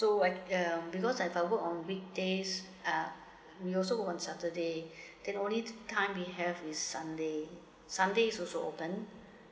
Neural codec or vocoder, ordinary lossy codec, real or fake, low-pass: none; none; real; none